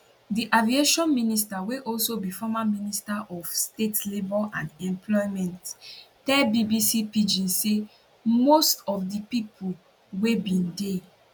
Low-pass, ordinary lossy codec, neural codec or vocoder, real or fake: none; none; none; real